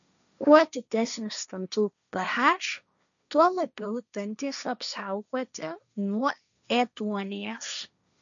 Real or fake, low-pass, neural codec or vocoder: fake; 7.2 kHz; codec, 16 kHz, 1.1 kbps, Voila-Tokenizer